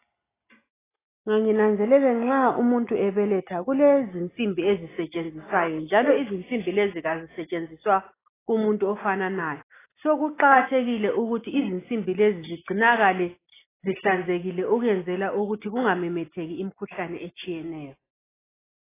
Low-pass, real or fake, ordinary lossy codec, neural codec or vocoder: 3.6 kHz; real; AAC, 16 kbps; none